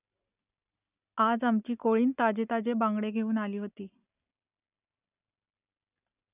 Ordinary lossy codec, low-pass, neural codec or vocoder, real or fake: none; 3.6 kHz; none; real